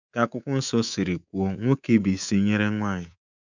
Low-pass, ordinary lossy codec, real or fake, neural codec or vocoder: 7.2 kHz; none; fake; codec, 24 kHz, 3.1 kbps, DualCodec